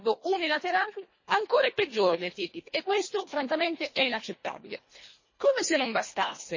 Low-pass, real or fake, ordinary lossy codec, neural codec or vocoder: 7.2 kHz; fake; MP3, 32 kbps; codec, 24 kHz, 1.5 kbps, HILCodec